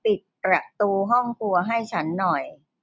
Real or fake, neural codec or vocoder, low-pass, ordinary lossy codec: real; none; none; none